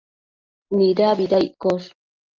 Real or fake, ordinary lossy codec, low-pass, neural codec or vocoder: real; Opus, 32 kbps; 7.2 kHz; none